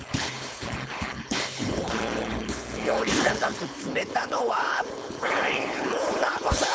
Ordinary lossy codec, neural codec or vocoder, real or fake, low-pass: none; codec, 16 kHz, 4.8 kbps, FACodec; fake; none